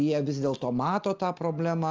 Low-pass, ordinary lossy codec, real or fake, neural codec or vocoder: 7.2 kHz; Opus, 24 kbps; real; none